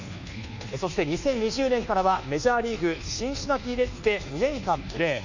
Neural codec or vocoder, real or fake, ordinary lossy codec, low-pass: codec, 24 kHz, 1.2 kbps, DualCodec; fake; none; 7.2 kHz